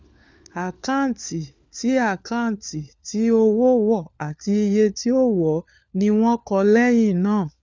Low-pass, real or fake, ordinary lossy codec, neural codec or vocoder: none; fake; none; codec, 16 kHz, 4 kbps, FunCodec, trained on LibriTTS, 50 frames a second